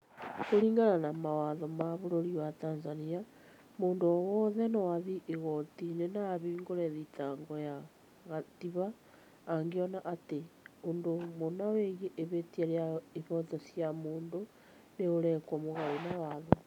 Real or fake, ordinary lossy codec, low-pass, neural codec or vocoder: real; none; 19.8 kHz; none